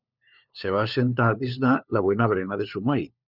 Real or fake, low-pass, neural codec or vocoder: fake; 5.4 kHz; codec, 16 kHz, 4 kbps, FunCodec, trained on LibriTTS, 50 frames a second